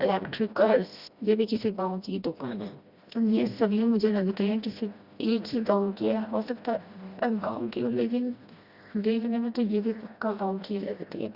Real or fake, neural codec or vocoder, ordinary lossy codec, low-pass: fake; codec, 16 kHz, 1 kbps, FreqCodec, smaller model; Opus, 64 kbps; 5.4 kHz